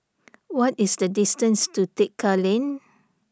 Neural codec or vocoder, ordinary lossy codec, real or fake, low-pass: none; none; real; none